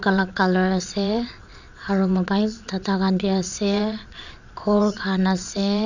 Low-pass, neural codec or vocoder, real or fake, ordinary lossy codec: 7.2 kHz; vocoder, 22.05 kHz, 80 mel bands, Vocos; fake; none